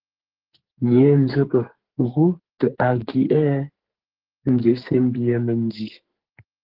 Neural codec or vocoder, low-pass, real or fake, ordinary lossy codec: codec, 44.1 kHz, 2.6 kbps, SNAC; 5.4 kHz; fake; Opus, 16 kbps